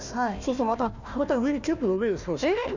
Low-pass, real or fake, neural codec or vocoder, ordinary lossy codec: 7.2 kHz; fake; codec, 16 kHz, 1 kbps, FunCodec, trained on Chinese and English, 50 frames a second; none